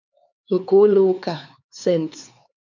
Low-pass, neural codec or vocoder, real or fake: 7.2 kHz; codec, 16 kHz, 4 kbps, X-Codec, HuBERT features, trained on LibriSpeech; fake